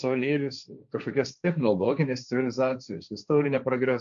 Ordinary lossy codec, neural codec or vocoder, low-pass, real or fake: MP3, 96 kbps; codec, 16 kHz, 1.1 kbps, Voila-Tokenizer; 7.2 kHz; fake